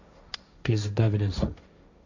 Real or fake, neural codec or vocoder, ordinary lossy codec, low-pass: fake; codec, 16 kHz, 1.1 kbps, Voila-Tokenizer; AAC, 48 kbps; 7.2 kHz